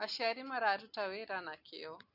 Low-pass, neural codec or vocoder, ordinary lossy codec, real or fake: 5.4 kHz; none; none; real